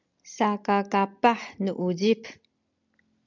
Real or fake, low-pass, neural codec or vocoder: real; 7.2 kHz; none